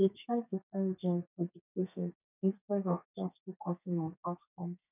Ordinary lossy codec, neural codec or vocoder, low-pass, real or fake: none; codec, 32 kHz, 1.9 kbps, SNAC; 3.6 kHz; fake